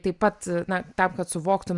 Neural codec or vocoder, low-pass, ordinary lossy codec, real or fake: none; 10.8 kHz; Opus, 64 kbps; real